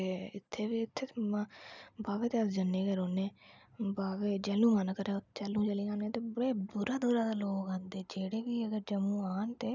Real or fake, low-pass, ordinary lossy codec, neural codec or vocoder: real; 7.2 kHz; none; none